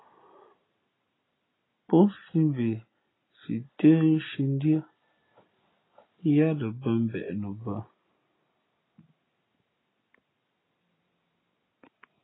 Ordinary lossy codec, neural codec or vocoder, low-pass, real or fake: AAC, 16 kbps; none; 7.2 kHz; real